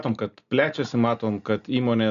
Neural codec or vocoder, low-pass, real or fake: none; 7.2 kHz; real